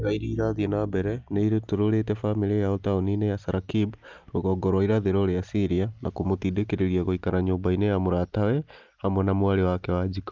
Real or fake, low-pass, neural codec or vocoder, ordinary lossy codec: real; 7.2 kHz; none; Opus, 24 kbps